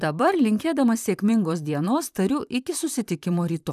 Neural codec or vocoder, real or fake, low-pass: vocoder, 44.1 kHz, 128 mel bands, Pupu-Vocoder; fake; 14.4 kHz